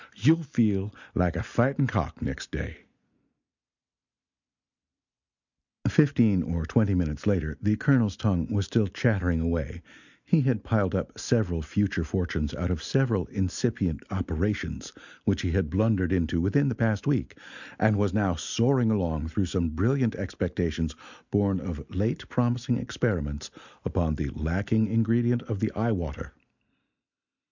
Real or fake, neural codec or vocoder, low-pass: real; none; 7.2 kHz